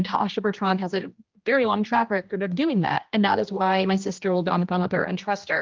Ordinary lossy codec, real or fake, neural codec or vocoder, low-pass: Opus, 24 kbps; fake; codec, 16 kHz, 1 kbps, X-Codec, HuBERT features, trained on general audio; 7.2 kHz